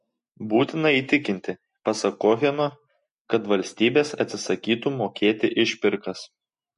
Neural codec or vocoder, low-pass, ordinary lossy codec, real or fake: none; 10.8 kHz; MP3, 48 kbps; real